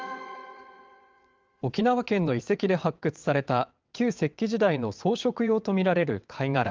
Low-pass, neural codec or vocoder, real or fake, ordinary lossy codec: 7.2 kHz; vocoder, 22.05 kHz, 80 mel bands, WaveNeXt; fake; Opus, 32 kbps